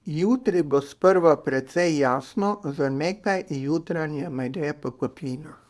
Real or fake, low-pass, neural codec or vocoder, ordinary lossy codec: fake; none; codec, 24 kHz, 0.9 kbps, WavTokenizer, small release; none